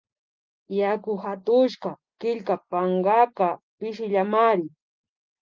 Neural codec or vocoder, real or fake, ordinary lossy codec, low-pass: none; real; Opus, 32 kbps; 7.2 kHz